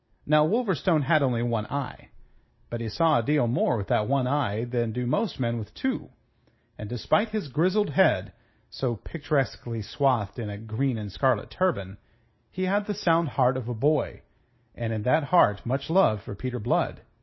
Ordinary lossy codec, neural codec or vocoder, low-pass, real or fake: MP3, 24 kbps; none; 7.2 kHz; real